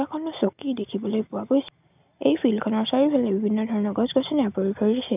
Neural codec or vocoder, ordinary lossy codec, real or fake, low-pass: none; none; real; 3.6 kHz